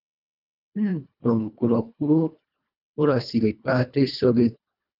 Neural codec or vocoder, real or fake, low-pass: codec, 24 kHz, 1.5 kbps, HILCodec; fake; 5.4 kHz